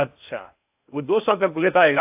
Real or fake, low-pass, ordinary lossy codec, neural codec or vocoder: fake; 3.6 kHz; AAC, 32 kbps; codec, 16 kHz in and 24 kHz out, 0.6 kbps, FocalCodec, streaming, 2048 codes